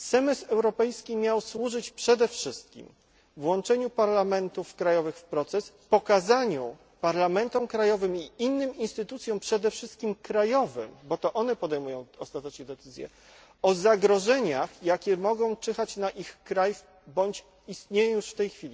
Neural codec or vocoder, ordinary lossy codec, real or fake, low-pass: none; none; real; none